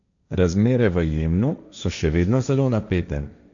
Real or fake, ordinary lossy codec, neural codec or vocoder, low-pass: fake; none; codec, 16 kHz, 1.1 kbps, Voila-Tokenizer; 7.2 kHz